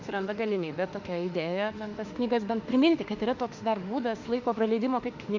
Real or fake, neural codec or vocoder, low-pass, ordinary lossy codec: fake; autoencoder, 48 kHz, 32 numbers a frame, DAC-VAE, trained on Japanese speech; 7.2 kHz; Opus, 64 kbps